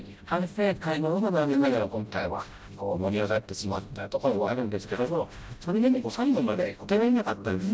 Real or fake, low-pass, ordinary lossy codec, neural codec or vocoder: fake; none; none; codec, 16 kHz, 0.5 kbps, FreqCodec, smaller model